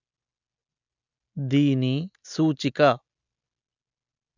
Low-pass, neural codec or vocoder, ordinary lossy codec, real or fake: 7.2 kHz; none; none; real